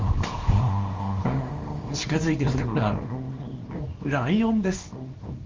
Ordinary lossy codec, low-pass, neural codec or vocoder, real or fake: Opus, 32 kbps; 7.2 kHz; codec, 24 kHz, 0.9 kbps, WavTokenizer, small release; fake